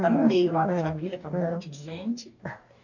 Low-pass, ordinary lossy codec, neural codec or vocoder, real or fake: 7.2 kHz; none; codec, 44.1 kHz, 2.6 kbps, DAC; fake